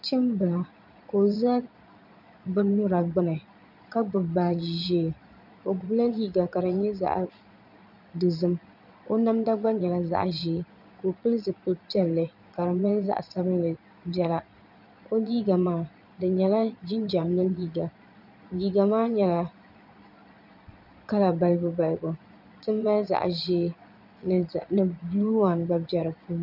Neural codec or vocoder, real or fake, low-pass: vocoder, 22.05 kHz, 80 mel bands, Vocos; fake; 5.4 kHz